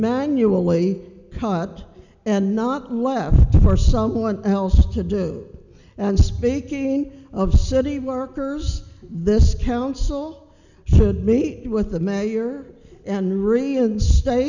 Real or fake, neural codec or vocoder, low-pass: real; none; 7.2 kHz